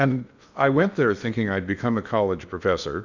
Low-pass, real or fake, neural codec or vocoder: 7.2 kHz; fake; codec, 16 kHz in and 24 kHz out, 0.8 kbps, FocalCodec, streaming, 65536 codes